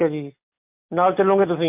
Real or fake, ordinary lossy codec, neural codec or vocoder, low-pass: real; MP3, 32 kbps; none; 3.6 kHz